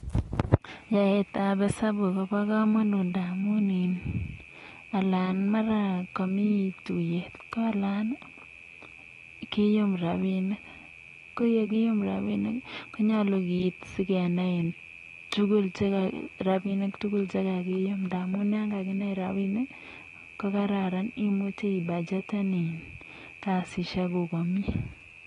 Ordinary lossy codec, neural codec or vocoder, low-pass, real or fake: AAC, 32 kbps; none; 10.8 kHz; real